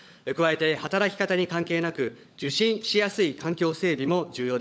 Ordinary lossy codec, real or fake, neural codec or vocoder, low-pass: none; fake; codec, 16 kHz, 16 kbps, FunCodec, trained on LibriTTS, 50 frames a second; none